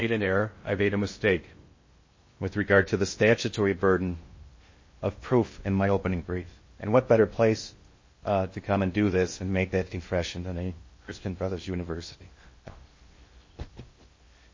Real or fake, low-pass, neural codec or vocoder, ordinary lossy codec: fake; 7.2 kHz; codec, 16 kHz in and 24 kHz out, 0.6 kbps, FocalCodec, streaming, 2048 codes; MP3, 32 kbps